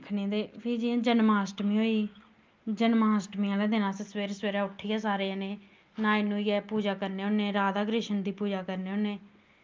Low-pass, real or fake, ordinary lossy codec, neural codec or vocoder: 7.2 kHz; real; Opus, 32 kbps; none